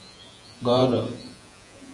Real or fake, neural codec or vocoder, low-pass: fake; vocoder, 48 kHz, 128 mel bands, Vocos; 10.8 kHz